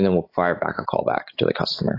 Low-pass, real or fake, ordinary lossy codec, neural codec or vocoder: 5.4 kHz; real; AAC, 24 kbps; none